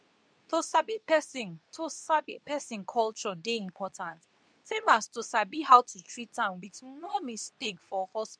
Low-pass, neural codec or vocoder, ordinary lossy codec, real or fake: 9.9 kHz; codec, 24 kHz, 0.9 kbps, WavTokenizer, medium speech release version 2; MP3, 96 kbps; fake